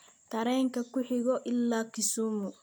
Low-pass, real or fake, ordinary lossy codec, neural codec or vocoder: none; real; none; none